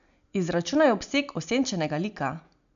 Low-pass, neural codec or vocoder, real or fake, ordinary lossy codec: 7.2 kHz; none; real; none